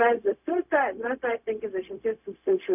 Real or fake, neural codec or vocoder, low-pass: fake; codec, 16 kHz, 0.4 kbps, LongCat-Audio-Codec; 3.6 kHz